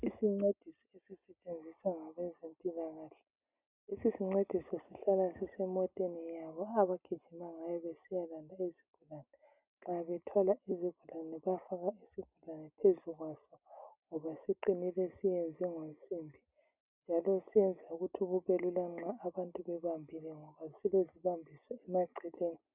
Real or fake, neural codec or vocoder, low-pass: real; none; 3.6 kHz